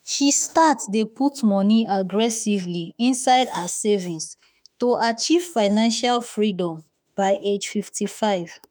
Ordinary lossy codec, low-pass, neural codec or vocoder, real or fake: none; none; autoencoder, 48 kHz, 32 numbers a frame, DAC-VAE, trained on Japanese speech; fake